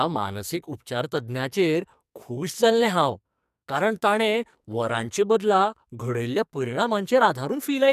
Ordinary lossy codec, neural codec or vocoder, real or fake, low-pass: none; codec, 44.1 kHz, 2.6 kbps, SNAC; fake; 14.4 kHz